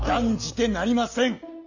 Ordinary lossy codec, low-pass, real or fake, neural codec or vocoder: none; 7.2 kHz; real; none